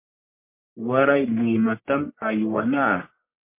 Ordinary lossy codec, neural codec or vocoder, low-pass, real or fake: MP3, 24 kbps; codec, 44.1 kHz, 1.7 kbps, Pupu-Codec; 3.6 kHz; fake